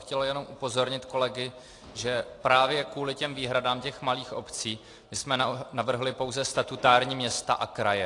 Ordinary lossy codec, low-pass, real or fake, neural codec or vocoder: AAC, 48 kbps; 10.8 kHz; real; none